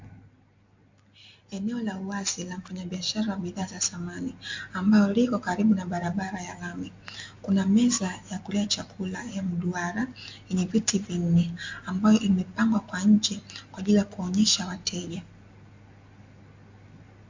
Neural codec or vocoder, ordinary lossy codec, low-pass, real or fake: none; MP3, 48 kbps; 7.2 kHz; real